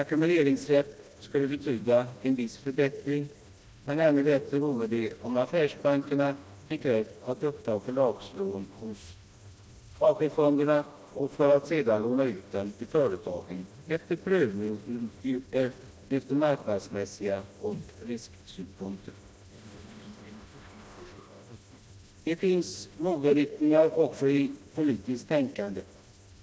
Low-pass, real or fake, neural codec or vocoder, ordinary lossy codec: none; fake; codec, 16 kHz, 1 kbps, FreqCodec, smaller model; none